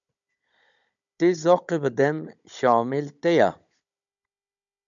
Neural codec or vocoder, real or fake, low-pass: codec, 16 kHz, 16 kbps, FunCodec, trained on Chinese and English, 50 frames a second; fake; 7.2 kHz